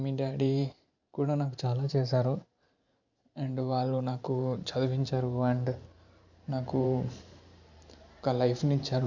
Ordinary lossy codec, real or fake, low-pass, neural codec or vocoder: none; fake; 7.2 kHz; vocoder, 44.1 kHz, 128 mel bands every 512 samples, BigVGAN v2